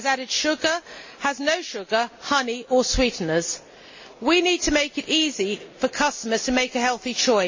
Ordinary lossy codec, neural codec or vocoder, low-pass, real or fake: MP3, 32 kbps; none; 7.2 kHz; real